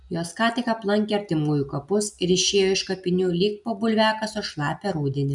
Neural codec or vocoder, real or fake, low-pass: none; real; 10.8 kHz